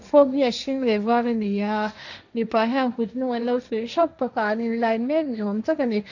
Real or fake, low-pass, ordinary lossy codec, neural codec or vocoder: fake; 7.2 kHz; none; codec, 16 kHz, 1.1 kbps, Voila-Tokenizer